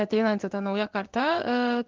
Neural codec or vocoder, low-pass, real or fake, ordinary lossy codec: codec, 16 kHz in and 24 kHz out, 1 kbps, XY-Tokenizer; 7.2 kHz; fake; Opus, 24 kbps